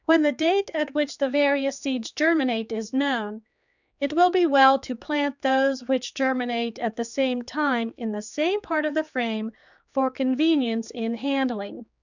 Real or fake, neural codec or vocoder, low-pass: fake; codec, 16 kHz, 4 kbps, X-Codec, HuBERT features, trained on general audio; 7.2 kHz